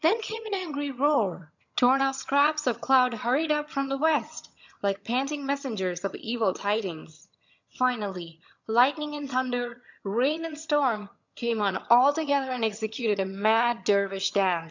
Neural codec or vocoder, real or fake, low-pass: vocoder, 22.05 kHz, 80 mel bands, HiFi-GAN; fake; 7.2 kHz